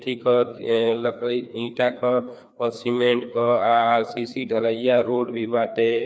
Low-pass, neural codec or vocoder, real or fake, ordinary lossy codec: none; codec, 16 kHz, 2 kbps, FreqCodec, larger model; fake; none